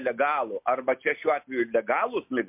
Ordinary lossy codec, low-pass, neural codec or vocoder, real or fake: MP3, 32 kbps; 3.6 kHz; none; real